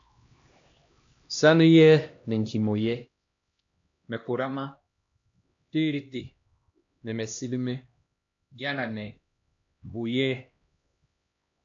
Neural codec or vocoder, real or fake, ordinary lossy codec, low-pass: codec, 16 kHz, 1 kbps, X-Codec, HuBERT features, trained on LibriSpeech; fake; AAC, 48 kbps; 7.2 kHz